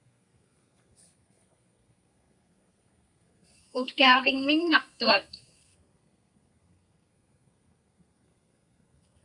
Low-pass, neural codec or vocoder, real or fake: 10.8 kHz; codec, 32 kHz, 1.9 kbps, SNAC; fake